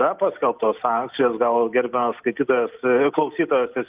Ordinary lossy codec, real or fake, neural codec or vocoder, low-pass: Opus, 24 kbps; real; none; 3.6 kHz